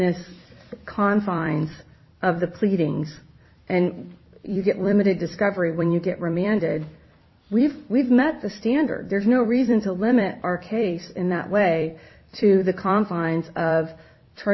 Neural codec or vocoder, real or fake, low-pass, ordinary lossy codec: vocoder, 44.1 kHz, 128 mel bands every 256 samples, BigVGAN v2; fake; 7.2 kHz; MP3, 24 kbps